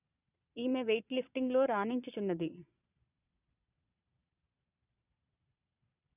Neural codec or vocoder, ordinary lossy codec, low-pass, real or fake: vocoder, 22.05 kHz, 80 mel bands, Vocos; none; 3.6 kHz; fake